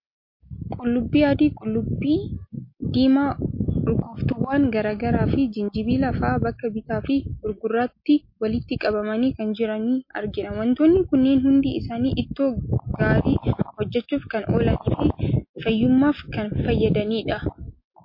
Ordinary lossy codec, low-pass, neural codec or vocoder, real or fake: MP3, 32 kbps; 5.4 kHz; none; real